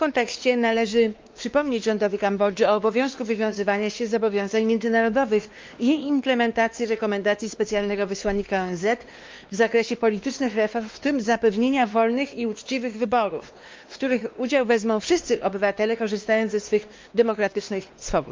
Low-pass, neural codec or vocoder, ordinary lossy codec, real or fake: 7.2 kHz; codec, 16 kHz, 2 kbps, X-Codec, WavLM features, trained on Multilingual LibriSpeech; Opus, 32 kbps; fake